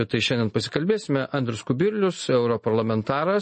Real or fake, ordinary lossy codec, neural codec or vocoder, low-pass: real; MP3, 32 kbps; none; 10.8 kHz